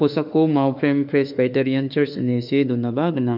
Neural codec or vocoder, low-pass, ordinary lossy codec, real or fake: autoencoder, 48 kHz, 32 numbers a frame, DAC-VAE, trained on Japanese speech; 5.4 kHz; none; fake